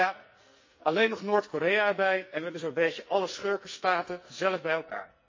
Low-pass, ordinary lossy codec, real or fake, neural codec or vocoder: 7.2 kHz; MP3, 32 kbps; fake; codec, 44.1 kHz, 2.6 kbps, SNAC